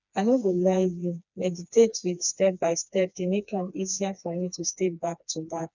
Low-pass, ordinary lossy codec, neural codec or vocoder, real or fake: 7.2 kHz; none; codec, 16 kHz, 2 kbps, FreqCodec, smaller model; fake